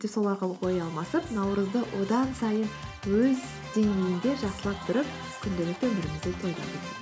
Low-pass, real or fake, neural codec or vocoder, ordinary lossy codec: none; real; none; none